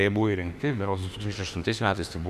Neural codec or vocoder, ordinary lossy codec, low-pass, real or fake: autoencoder, 48 kHz, 32 numbers a frame, DAC-VAE, trained on Japanese speech; Opus, 64 kbps; 14.4 kHz; fake